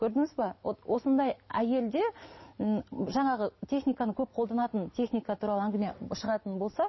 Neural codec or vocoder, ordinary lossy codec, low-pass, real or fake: none; MP3, 24 kbps; 7.2 kHz; real